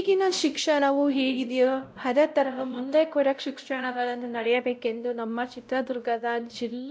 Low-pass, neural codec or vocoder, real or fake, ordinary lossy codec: none; codec, 16 kHz, 0.5 kbps, X-Codec, WavLM features, trained on Multilingual LibriSpeech; fake; none